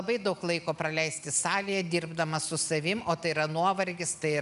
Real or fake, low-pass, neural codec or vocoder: real; 10.8 kHz; none